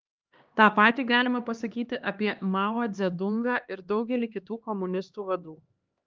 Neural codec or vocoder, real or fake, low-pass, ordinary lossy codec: codec, 16 kHz, 2 kbps, X-Codec, HuBERT features, trained on LibriSpeech; fake; 7.2 kHz; Opus, 24 kbps